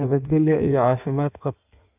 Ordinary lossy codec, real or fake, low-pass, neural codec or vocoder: none; fake; 3.6 kHz; codec, 16 kHz in and 24 kHz out, 1.1 kbps, FireRedTTS-2 codec